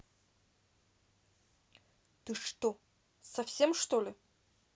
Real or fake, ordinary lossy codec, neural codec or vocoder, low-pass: real; none; none; none